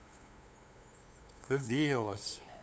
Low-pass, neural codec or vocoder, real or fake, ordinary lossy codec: none; codec, 16 kHz, 8 kbps, FunCodec, trained on LibriTTS, 25 frames a second; fake; none